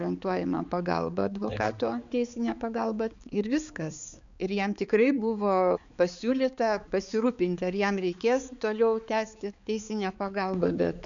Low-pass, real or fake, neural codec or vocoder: 7.2 kHz; fake; codec, 16 kHz, 4 kbps, X-Codec, HuBERT features, trained on balanced general audio